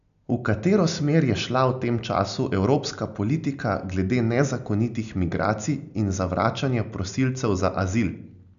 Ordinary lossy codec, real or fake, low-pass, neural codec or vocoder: none; real; 7.2 kHz; none